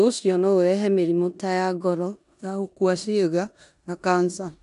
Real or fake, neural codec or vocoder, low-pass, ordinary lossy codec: fake; codec, 16 kHz in and 24 kHz out, 0.9 kbps, LongCat-Audio-Codec, four codebook decoder; 10.8 kHz; none